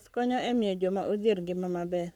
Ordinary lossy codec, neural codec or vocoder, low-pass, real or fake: none; codec, 44.1 kHz, 7.8 kbps, Pupu-Codec; 19.8 kHz; fake